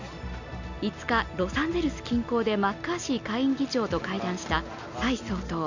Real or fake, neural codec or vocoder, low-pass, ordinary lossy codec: real; none; 7.2 kHz; none